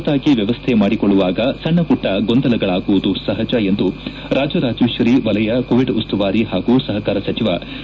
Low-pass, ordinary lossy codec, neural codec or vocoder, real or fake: none; none; none; real